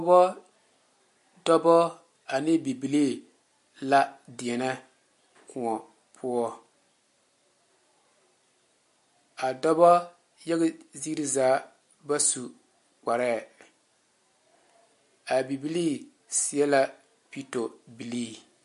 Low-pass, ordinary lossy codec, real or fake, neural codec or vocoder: 14.4 kHz; MP3, 48 kbps; real; none